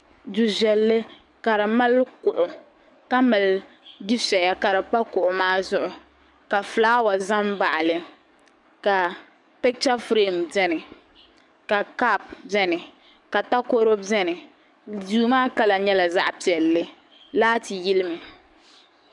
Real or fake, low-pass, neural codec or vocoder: fake; 10.8 kHz; codec, 44.1 kHz, 7.8 kbps, DAC